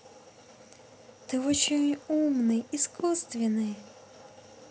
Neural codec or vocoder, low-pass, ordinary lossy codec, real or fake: none; none; none; real